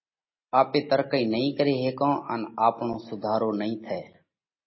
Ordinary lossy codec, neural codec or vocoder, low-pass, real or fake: MP3, 24 kbps; none; 7.2 kHz; real